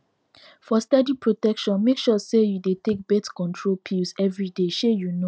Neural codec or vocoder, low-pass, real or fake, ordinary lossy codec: none; none; real; none